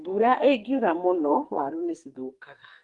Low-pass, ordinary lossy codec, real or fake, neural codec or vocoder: 10.8 kHz; Opus, 24 kbps; fake; codec, 44.1 kHz, 2.6 kbps, SNAC